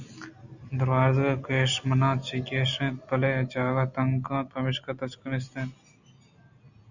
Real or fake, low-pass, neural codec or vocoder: real; 7.2 kHz; none